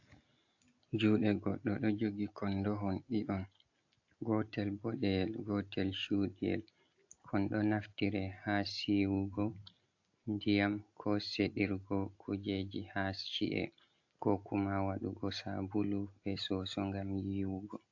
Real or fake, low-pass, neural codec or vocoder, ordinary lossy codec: real; 7.2 kHz; none; AAC, 48 kbps